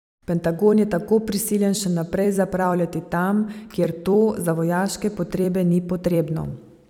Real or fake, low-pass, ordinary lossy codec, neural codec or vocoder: fake; 19.8 kHz; none; vocoder, 44.1 kHz, 128 mel bands every 256 samples, BigVGAN v2